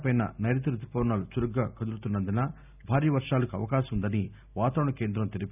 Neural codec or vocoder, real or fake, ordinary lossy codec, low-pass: none; real; none; 3.6 kHz